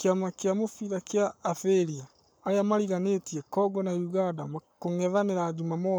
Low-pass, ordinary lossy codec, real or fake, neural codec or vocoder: none; none; fake; codec, 44.1 kHz, 7.8 kbps, Pupu-Codec